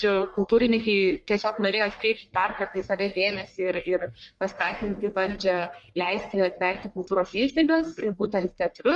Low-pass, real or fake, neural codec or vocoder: 10.8 kHz; fake; codec, 44.1 kHz, 1.7 kbps, Pupu-Codec